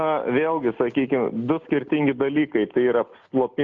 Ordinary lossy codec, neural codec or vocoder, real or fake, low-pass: Opus, 24 kbps; none; real; 7.2 kHz